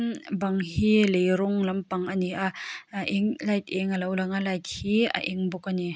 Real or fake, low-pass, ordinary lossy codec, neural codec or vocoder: real; none; none; none